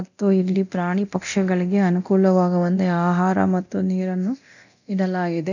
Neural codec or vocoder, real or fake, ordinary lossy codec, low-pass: codec, 24 kHz, 0.9 kbps, DualCodec; fake; none; 7.2 kHz